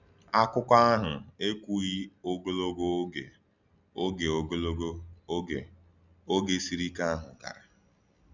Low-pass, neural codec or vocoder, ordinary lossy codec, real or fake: 7.2 kHz; none; none; real